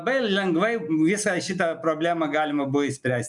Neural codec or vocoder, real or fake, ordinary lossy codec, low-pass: none; real; MP3, 96 kbps; 10.8 kHz